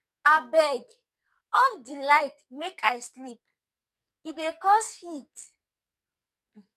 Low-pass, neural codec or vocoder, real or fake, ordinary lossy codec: 14.4 kHz; codec, 44.1 kHz, 2.6 kbps, SNAC; fake; none